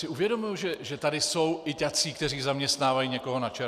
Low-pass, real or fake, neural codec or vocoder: 14.4 kHz; real; none